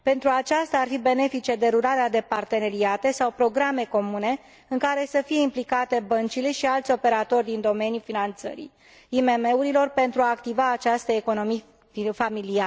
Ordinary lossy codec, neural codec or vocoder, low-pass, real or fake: none; none; none; real